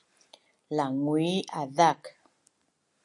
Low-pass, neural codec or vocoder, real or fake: 10.8 kHz; none; real